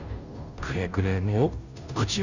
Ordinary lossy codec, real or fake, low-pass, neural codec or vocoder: none; fake; 7.2 kHz; codec, 16 kHz, 0.5 kbps, FunCodec, trained on Chinese and English, 25 frames a second